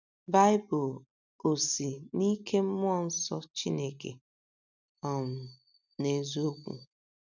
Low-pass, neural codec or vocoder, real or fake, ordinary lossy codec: 7.2 kHz; none; real; none